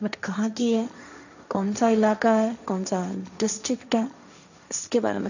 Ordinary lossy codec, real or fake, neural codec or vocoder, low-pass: none; fake; codec, 16 kHz, 1.1 kbps, Voila-Tokenizer; 7.2 kHz